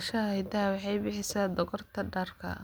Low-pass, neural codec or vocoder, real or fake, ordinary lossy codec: none; none; real; none